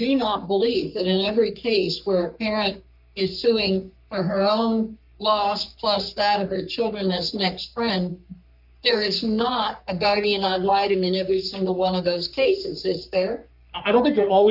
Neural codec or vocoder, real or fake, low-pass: codec, 44.1 kHz, 3.4 kbps, Pupu-Codec; fake; 5.4 kHz